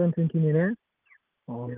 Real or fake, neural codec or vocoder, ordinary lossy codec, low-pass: real; none; Opus, 24 kbps; 3.6 kHz